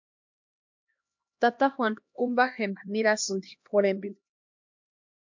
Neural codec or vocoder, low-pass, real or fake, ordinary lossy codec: codec, 16 kHz, 1 kbps, X-Codec, HuBERT features, trained on LibriSpeech; 7.2 kHz; fake; MP3, 48 kbps